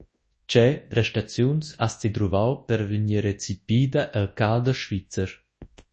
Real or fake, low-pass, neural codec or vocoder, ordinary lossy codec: fake; 10.8 kHz; codec, 24 kHz, 0.9 kbps, WavTokenizer, large speech release; MP3, 32 kbps